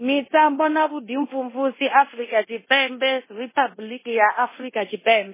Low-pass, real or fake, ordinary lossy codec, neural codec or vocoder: 3.6 kHz; fake; MP3, 16 kbps; codec, 24 kHz, 0.9 kbps, DualCodec